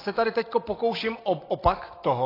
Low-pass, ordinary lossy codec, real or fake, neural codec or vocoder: 5.4 kHz; AAC, 24 kbps; real; none